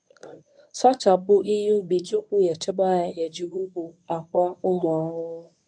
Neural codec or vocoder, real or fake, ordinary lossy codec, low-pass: codec, 24 kHz, 0.9 kbps, WavTokenizer, medium speech release version 1; fake; none; 9.9 kHz